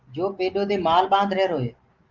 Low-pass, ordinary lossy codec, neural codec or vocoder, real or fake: 7.2 kHz; Opus, 32 kbps; none; real